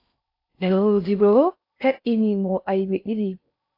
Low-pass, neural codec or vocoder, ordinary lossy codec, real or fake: 5.4 kHz; codec, 16 kHz in and 24 kHz out, 0.6 kbps, FocalCodec, streaming, 4096 codes; AAC, 32 kbps; fake